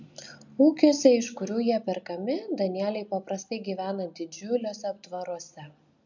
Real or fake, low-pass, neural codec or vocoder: real; 7.2 kHz; none